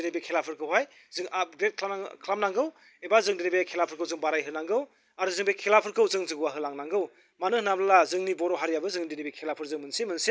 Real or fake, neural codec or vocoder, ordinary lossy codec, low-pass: real; none; none; none